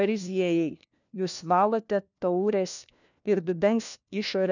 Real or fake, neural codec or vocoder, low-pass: fake; codec, 16 kHz, 1 kbps, FunCodec, trained on LibriTTS, 50 frames a second; 7.2 kHz